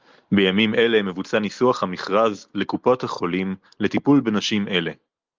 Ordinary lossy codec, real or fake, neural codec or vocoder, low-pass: Opus, 24 kbps; real; none; 7.2 kHz